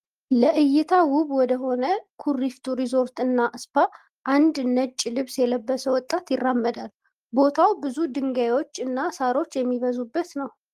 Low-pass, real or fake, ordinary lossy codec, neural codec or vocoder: 14.4 kHz; real; Opus, 24 kbps; none